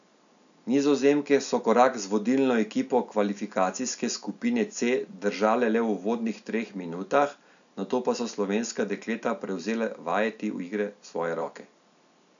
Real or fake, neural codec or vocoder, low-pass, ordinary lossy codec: real; none; 7.2 kHz; none